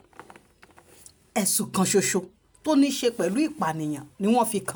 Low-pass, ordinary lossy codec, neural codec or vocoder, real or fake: none; none; none; real